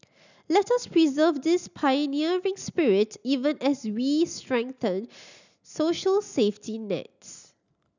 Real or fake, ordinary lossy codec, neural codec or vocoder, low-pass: real; none; none; 7.2 kHz